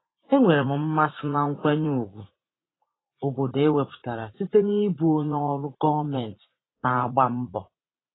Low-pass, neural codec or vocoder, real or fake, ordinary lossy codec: 7.2 kHz; vocoder, 44.1 kHz, 80 mel bands, Vocos; fake; AAC, 16 kbps